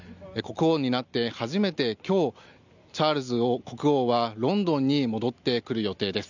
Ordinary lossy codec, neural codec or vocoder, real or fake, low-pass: none; none; real; 7.2 kHz